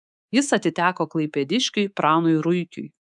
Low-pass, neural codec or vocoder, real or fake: 10.8 kHz; codec, 24 kHz, 3.1 kbps, DualCodec; fake